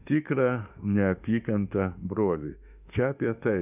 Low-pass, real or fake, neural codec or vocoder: 3.6 kHz; fake; autoencoder, 48 kHz, 32 numbers a frame, DAC-VAE, trained on Japanese speech